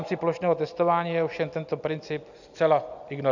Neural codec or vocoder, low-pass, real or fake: none; 7.2 kHz; real